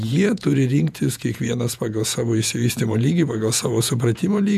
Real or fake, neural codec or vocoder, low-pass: fake; vocoder, 48 kHz, 128 mel bands, Vocos; 14.4 kHz